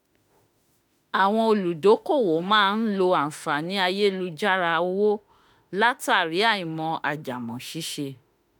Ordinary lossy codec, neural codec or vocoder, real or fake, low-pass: none; autoencoder, 48 kHz, 32 numbers a frame, DAC-VAE, trained on Japanese speech; fake; none